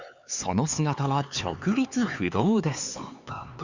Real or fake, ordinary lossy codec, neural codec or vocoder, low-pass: fake; Opus, 64 kbps; codec, 16 kHz, 4 kbps, X-Codec, HuBERT features, trained on LibriSpeech; 7.2 kHz